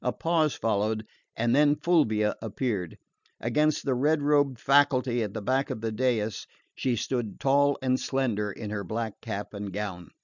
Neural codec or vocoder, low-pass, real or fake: none; 7.2 kHz; real